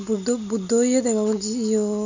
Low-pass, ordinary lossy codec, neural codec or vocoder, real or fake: 7.2 kHz; none; none; real